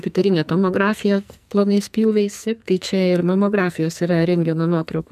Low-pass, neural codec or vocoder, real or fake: 14.4 kHz; codec, 44.1 kHz, 2.6 kbps, SNAC; fake